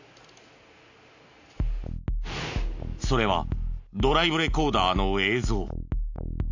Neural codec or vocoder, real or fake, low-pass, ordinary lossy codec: none; real; 7.2 kHz; AAC, 48 kbps